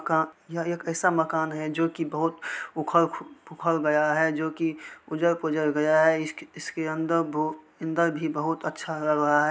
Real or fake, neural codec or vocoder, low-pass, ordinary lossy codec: real; none; none; none